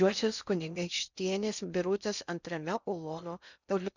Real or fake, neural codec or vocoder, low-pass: fake; codec, 16 kHz in and 24 kHz out, 0.6 kbps, FocalCodec, streaming, 2048 codes; 7.2 kHz